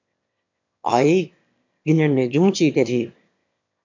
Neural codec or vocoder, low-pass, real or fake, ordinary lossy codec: autoencoder, 22.05 kHz, a latent of 192 numbers a frame, VITS, trained on one speaker; 7.2 kHz; fake; MP3, 64 kbps